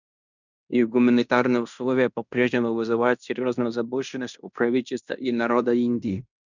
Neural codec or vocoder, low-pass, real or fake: codec, 16 kHz in and 24 kHz out, 0.9 kbps, LongCat-Audio-Codec, fine tuned four codebook decoder; 7.2 kHz; fake